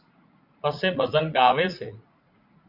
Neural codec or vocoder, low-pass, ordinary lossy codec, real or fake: vocoder, 44.1 kHz, 80 mel bands, Vocos; 5.4 kHz; Opus, 64 kbps; fake